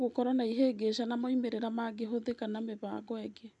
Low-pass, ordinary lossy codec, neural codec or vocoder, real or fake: 10.8 kHz; none; none; real